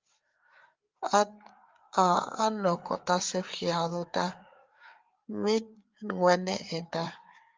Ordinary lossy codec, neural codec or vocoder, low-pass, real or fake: Opus, 32 kbps; codec, 16 kHz, 4 kbps, FreqCodec, larger model; 7.2 kHz; fake